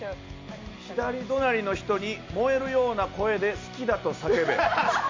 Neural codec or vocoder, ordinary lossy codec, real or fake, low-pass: none; MP3, 48 kbps; real; 7.2 kHz